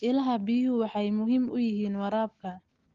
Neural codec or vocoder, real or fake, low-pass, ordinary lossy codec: none; real; 7.2 kHz; Opus, 16 kbps